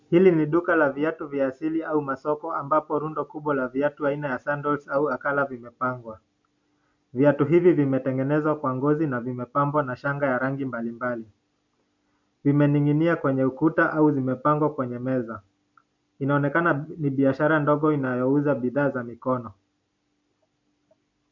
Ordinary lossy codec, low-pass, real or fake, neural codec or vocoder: MP3, 48 kbps; 7.2 kHz; real; none